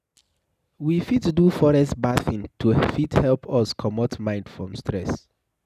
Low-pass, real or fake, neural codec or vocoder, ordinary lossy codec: 14.4 kHz; real; none; none